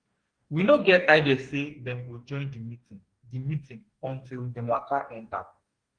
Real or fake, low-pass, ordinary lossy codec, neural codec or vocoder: fake; 9.9 kHz; Opus, 24 kbps; codec, 44.1 kHz, 2.6 kbps, DAC